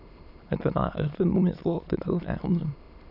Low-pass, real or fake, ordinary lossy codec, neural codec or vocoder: 5.4 kHz; fake; none; autoencoder, 22.05 kHz, a latent of 192 numbers a frame, VITS, trained on many speakers